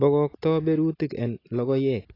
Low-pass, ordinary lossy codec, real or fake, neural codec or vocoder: 5.4 kHz; AAC, 24 kbps; real; none